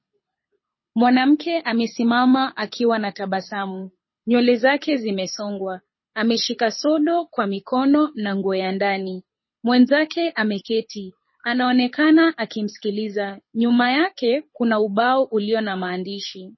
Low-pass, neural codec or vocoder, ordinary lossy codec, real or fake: 7.2 kHz; codec, 24 kHz, 6 kbps, HILCodec; MP3, 24 kbps; fake